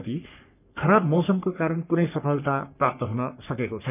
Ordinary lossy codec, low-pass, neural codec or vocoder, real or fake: none; 3.6 kHz; codec, 44.1 kHz, 3.4 kbps, Pupu-Codec; fake